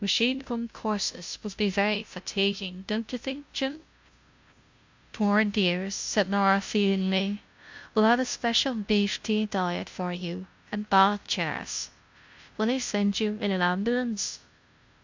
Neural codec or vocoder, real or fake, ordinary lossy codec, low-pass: codec, 16 kHz, 0.5 kbps, FunCodec, trained on Chinese and English, 25 frames a second; fake; MP3, 64 kbps; 7.2 kHz